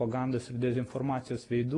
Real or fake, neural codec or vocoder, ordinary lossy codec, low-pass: real; none; AAC, 32 kbps; 10.8 kHz